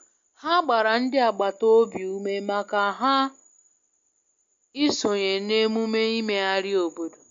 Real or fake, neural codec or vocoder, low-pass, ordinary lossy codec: real; none; 7.2 kHz; MP3, 48 kbps